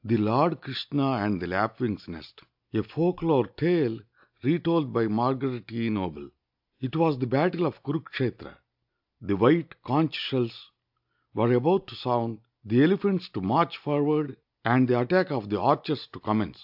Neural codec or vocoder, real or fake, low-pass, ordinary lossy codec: none; real; 5.4 kHz; AAC, 48 kbps